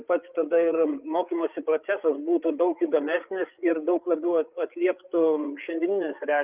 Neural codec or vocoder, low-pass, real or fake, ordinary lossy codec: codec, 16 kHz, 8 kbps, FreqCodec, larger model; 3.6 kHz; fake; Opus, 24 kbps